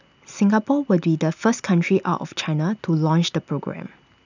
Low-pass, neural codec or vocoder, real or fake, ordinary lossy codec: 7.2 kHz; none; real; none